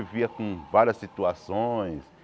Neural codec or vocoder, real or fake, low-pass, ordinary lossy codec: none; real; none; none